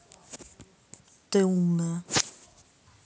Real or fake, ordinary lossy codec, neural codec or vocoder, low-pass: real; none; none; none